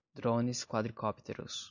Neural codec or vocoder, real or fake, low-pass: none; real; 7.2 kHz